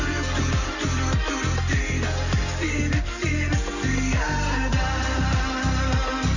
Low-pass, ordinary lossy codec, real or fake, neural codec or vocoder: 7.2 kHz; none; real; none